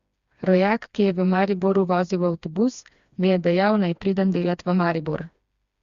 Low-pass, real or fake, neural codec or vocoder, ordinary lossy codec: 7.2 kHz; fake; codec, 16 kHz, 2 kbps, FreqCodec, smaller model; Opus, 64 kbps